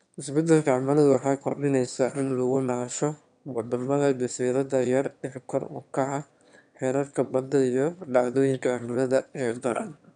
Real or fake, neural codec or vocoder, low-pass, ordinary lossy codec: fake; autoencoder, 22.05 kHz, a latent of 192 numbers a frame, VITS, trained on one speaker; 9.9 kHz; none